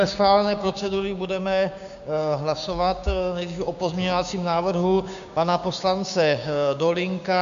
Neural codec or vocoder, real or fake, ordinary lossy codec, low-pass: codec, 16 kHz, 6 kbps, DAC; fake; AAC, 96 kbps; 7.2 kHz